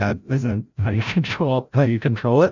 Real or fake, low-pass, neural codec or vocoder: fake; 7.2 kHz; codec, 16 kHz, 0.5 kbps, FreqCodec, larger model